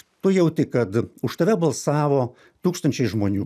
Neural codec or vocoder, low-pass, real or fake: none; 14.4 kHz; real